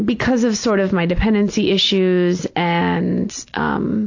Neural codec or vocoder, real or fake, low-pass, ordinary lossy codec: none; real; 7.2 kHz; AAC, 48 kbps